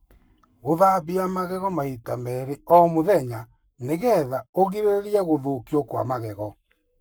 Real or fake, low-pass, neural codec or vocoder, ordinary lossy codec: fake; none; codec, 44.1 kHz, 7.8 kbps, Pupu-Codec; none